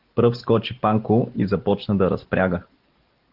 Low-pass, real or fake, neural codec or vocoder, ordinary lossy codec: 5.4 kHz; real; none; Opus, 16 kbps